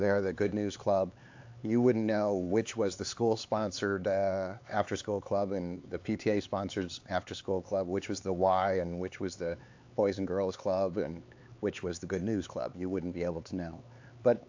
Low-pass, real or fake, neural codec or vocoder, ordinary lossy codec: 7.2 kHz; fake; codec, 16 kHz, 4 kbps, X-Codec, HuBERT features, trained on LibriSpeech; AAC, 48 kbps